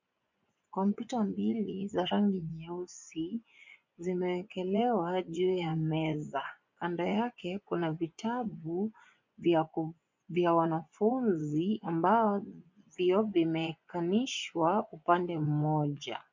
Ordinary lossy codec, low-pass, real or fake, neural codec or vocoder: MP3, 64 kbps; 7.2 kHz; fake; vocoder, 24 kHz, 100 mel bands, Vocos